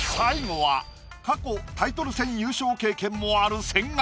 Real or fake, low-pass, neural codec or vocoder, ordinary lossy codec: real; none; none; none